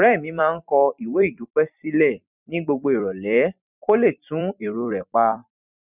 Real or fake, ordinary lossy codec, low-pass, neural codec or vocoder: fake; none; 3.6 kHz; vocoder, 44.1 kHz, 128 mel bands every 256 samples, BigVGAN v2